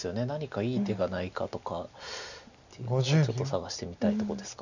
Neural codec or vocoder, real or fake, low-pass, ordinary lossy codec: none; real; 7.2 kHz; none